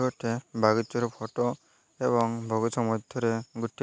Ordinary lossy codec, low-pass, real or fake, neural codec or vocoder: none; none; real; none